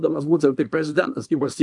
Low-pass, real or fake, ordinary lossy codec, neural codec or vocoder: 10.8 kHz; fake; MP3, 64 kbps; codec, 24 kHz, 0.9 kbps, WavTokenizer, small release